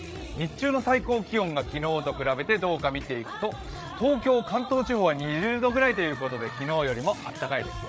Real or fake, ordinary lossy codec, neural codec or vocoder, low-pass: fake; none; codec, 16 kHz, 8 kbps, FreqCodec, larger model; none